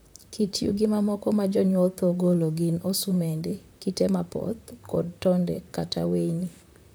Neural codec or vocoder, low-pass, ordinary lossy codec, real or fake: vocoder, 44.1 kHz, 128 mel bands, Pupu-Vocoder; none; none; fake